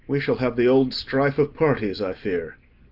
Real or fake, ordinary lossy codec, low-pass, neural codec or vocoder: real; Opus, 32 kbps; 5.4 kHz; none